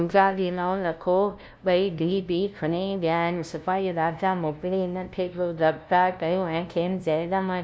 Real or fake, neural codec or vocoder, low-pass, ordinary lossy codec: fake; codec, 16 kHz, 0.5 kbps, FunCodec, trained on LibriTTS, 25 frames a second; none; none